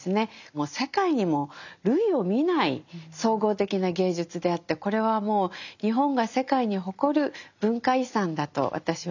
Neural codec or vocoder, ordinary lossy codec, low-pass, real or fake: none; none; 7.2 kHz; real